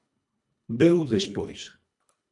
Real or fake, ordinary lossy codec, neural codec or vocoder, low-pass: fake; MP3, 96 kbps; codec, 24 kHz, 1.5 kbps, HILCodec; 10.8 kHz